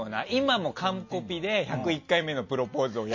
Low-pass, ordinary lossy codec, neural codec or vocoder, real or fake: 7.2 kHz; MP3, 32 kbps; none; real